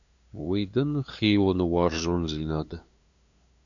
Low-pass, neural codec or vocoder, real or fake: 7.2 kHz; codec, 16 kHz, 2 kbps, FunCodec, trained on LibriTTS, 25 frames a second; fake